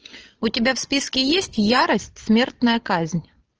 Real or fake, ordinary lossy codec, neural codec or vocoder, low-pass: real; Opus, 16 kbps; none; 7.2 kHz